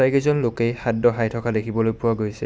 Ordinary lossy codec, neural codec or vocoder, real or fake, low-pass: none; none; real; none